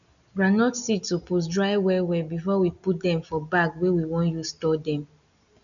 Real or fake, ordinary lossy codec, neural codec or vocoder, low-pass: real; none; none; 7.2 kHz